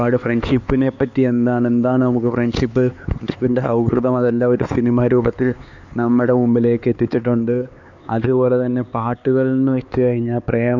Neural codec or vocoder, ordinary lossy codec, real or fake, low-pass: codec, 16 kHz, 4 kbps, X-Codec, HuBERT features, trained on LibriSpeech; none; fake; 7.2 kHz